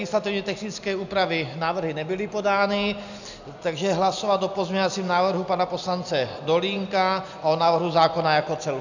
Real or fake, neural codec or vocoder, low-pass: real; none; 7.2 kHz